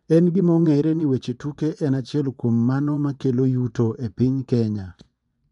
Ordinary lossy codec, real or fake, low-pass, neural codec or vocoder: none; fake; 10.8 kHz; vocoder, 24 kHz, 100 mel bands, Vocos